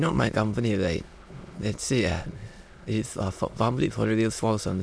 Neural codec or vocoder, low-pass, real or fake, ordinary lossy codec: autoencoder, 22.05 kHz, a latent of 192 numbers a frame, VITS, trained on many speakers; none; fake; none